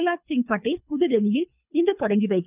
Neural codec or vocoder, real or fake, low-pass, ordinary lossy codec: codec, 24 kHz, 3 kbps, HILCodec; fake; 3.6 kHz; none